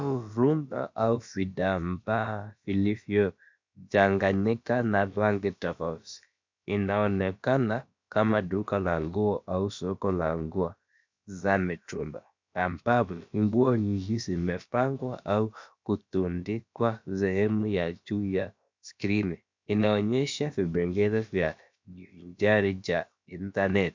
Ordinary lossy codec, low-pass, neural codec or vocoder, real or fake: MP3, 64 kbps; 7.2 kHz; codec, 16 kHz, about 1 kbps, DyCAST, with the encoder's durations; fake